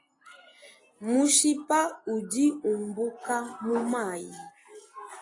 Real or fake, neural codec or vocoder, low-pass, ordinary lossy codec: real; none; 10.8 kHz; AAC, 32 kbps